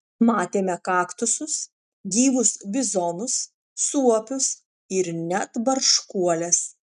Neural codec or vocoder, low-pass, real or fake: none; 10.8 kHz; real